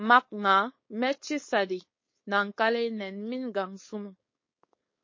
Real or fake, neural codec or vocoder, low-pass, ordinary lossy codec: fake; codec, 16 kHz, 4.8 kbps, FACodec; 7.2 kHz; MP3, 32 kbps